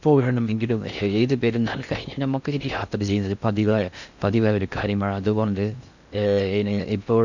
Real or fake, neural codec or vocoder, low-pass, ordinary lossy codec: fake; codec, 16 kHz in and 24 kHz out, 0.6 kbps, FocalCodec, streaming, 4096 codes; 7.2 kHz; none